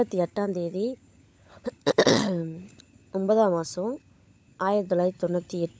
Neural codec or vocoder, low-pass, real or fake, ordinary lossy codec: codec, 16 kHz, 16 kbps, FunCodec, trained on LibriTTS, 50 frames a second; none; fake; none